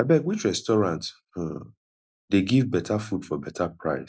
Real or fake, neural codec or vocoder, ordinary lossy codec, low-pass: real; none; none; none